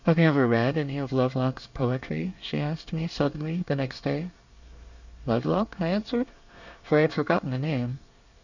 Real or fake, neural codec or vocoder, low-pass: fake; codec, 24 kHz, 1 kbps, SNAC; 7.2 kHz